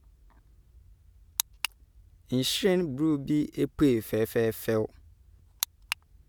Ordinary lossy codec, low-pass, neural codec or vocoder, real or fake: none; none; none; real